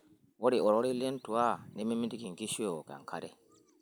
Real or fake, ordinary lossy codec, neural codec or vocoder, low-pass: real; none; none; none